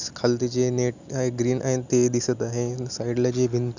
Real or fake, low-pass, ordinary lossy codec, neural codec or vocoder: real; 7.2 kHz; none; none